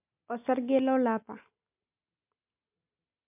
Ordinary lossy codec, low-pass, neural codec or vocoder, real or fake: MP3, 32 kbps; 3.6 kHz; none; real